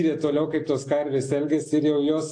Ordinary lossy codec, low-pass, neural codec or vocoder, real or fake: AAC, 48 kbps; 9.9 kHz; none; real